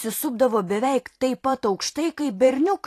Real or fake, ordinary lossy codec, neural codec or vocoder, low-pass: fake; AAC, 48 kbps; vocoder, 44.1 kHz, 128 mel bands every 512 samples, BigVGAN v2; 14.4 kHz